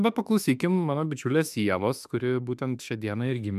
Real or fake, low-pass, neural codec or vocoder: fake; 14.4 kHz; autoencoder, 48 kHz, 32 numbers a frame, DAC-VAE, trained on Japanese speech